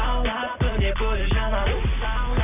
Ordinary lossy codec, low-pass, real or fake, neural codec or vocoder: none; 3.6 kHz; fake; vocoder, 44.1 kHz, 128 mel bands every 256 samples, BigVGAN v2